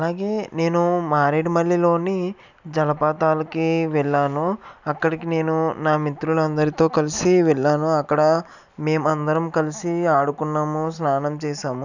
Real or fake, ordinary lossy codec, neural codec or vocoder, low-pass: real; none; none; 7.2 kHz